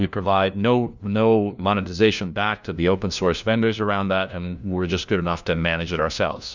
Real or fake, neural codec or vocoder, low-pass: fake; codec, 16 kHz, 1 kbps, FunCodec, trained on LibriTTS, 50 frames a second; 7.2 kHz